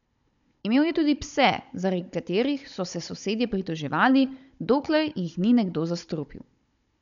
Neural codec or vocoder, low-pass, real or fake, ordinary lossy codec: codec, 16 kHz, 16 kbps, FunCodec, trained on Chinese and English, 50 frames a second; 7.2 kHz; fake; none